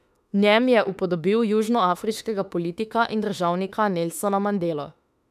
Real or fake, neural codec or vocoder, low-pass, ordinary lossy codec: fake; autoencoder, 48 kHz, 32 numbers a frame, DAC-VAE, trained on Japanese speech; 14.4 kHz; none